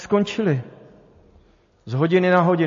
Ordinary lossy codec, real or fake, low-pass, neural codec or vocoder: MP3, 32 kbps; real; 7.2 kHz; none